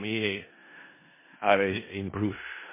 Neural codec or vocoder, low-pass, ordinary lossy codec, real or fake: codec, 16 kHz in and 24 kHz out, 0.4 kbps, LongCat-Audio-Codec, four codebook decoder; 3.6 kHz; MP3, 16 kbps; fake